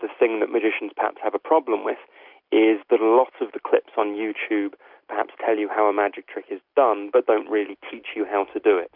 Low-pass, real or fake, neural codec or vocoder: 5.4 kHz; real; none